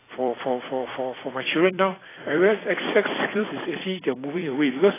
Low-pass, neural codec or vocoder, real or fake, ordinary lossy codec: 3.6 kHz; vocoder, 44.1 kHz, 128 mel bands every 256 samples, BigVGAN v2; fake; AAC, 16 kbps